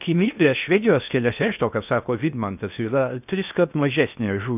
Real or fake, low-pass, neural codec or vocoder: fake; 3.6 kHz; codec, 16 kHz in and 24 kHz out, 0.6 kbps, FocalCodec, streaming, 2048 codes